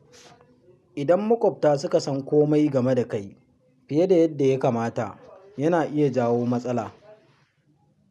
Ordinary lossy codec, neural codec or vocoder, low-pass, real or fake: none; none; none; real